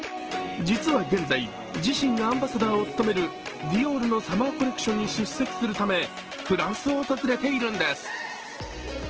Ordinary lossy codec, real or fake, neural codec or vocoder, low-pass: Opus, 16 kbps; real; none; 7.2 kHz